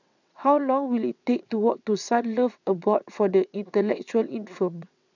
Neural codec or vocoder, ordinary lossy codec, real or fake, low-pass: vocoder, 22.05 kHz, 80 mel bands, WaveNeXt; none; fake; 7.2 kHz